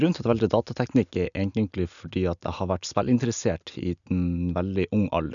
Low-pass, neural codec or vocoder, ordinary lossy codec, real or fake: 7.2 kHz; none; none; real